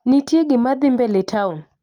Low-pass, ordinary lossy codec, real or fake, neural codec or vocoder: 19.8 kHz; Opus, 32 kbps; real; none